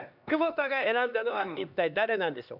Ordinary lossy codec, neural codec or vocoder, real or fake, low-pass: none; codec, 16 kHz, 2 kbps, X-Codec, HuBERT features, trained on LibriSpeech; fake; 5.4 kHz